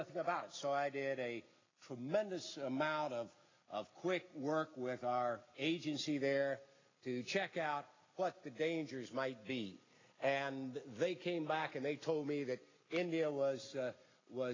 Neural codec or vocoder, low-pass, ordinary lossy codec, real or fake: none; 7.2 kHz; AAC, 32 kbps; real